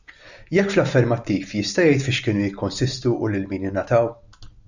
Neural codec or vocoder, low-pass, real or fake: none; 7.2 kHz; real